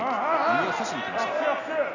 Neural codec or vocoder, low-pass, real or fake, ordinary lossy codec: none; 7.2 kHz; real; none